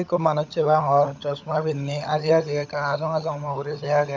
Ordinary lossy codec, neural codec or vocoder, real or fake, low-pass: none; codec, 16 kHz, 16 kbps, FunCodec, trained on LibriTTS, 50 frames a second; fake; none